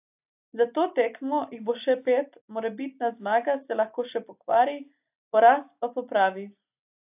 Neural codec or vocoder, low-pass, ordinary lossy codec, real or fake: none; 3.6 kHz; AAC, 32 kbps; real